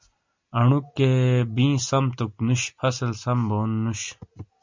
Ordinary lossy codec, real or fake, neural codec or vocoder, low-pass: MP3, 64 kbps; real; none; 7.2 kHz